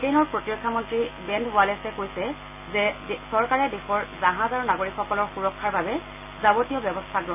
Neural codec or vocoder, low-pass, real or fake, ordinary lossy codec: none; 3.6 kHz; real; AAC, 32 kbps